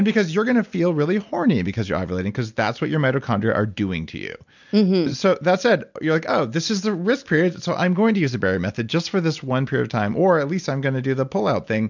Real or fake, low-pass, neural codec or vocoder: real; 7.2 kHz; none